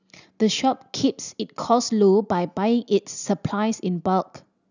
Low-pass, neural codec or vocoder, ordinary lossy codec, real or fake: 7.2 kHz; none; none; real